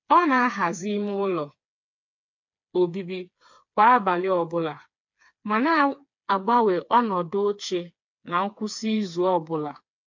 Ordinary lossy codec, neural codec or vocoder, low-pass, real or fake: MP3, 48 kbps; codec, 16 kHz, 4 kbps, FreqCodec, smaller model; 7.2 kHz; fake